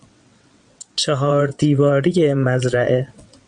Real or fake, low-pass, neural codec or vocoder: fake; 9.9 kHz; vocoder, 22.05 kHz, 80 mel bands, WaveNeXt